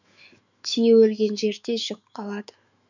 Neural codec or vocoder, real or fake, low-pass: autoencoder, 48 kHz, 128 numbers a frame, DAC-VAE, trained on Japanese speech; fake; 7.2 kHz